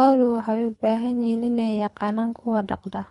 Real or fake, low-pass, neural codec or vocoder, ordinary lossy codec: fake; 10.8 kHz; codec, 24 kHz, 3 kbps, HILCodec; none